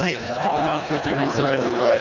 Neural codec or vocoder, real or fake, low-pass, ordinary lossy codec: codec, 24 kHz, 1.5 kbps, HILCodec; fake; 7.2 kHz; none